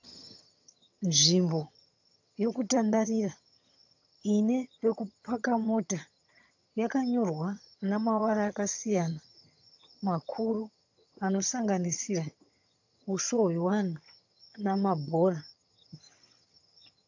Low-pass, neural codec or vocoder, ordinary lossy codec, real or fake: 7.2 kHz; vocoder, 22.05 kHz, 80 mel bands, HiFi-GAN; AAC, 48 kbps; fake